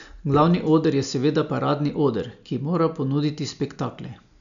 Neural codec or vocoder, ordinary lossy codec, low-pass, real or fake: none; none; 7.2 kHz; real